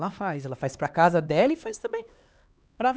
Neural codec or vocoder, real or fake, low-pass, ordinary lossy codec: codec, 16 kHz, 2 kbps, X-Codec, HuBERT features, trained on LibriSpeech; fake; none; none